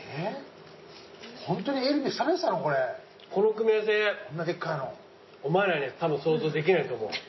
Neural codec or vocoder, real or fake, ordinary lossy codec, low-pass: none; real; MP3, 24 kbps; 7.2 kHz